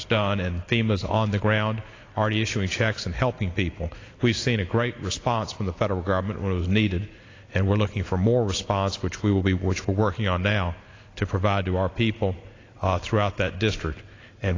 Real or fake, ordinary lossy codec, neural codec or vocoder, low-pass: real; AAC, 32 kbps; none; 7.2 kHz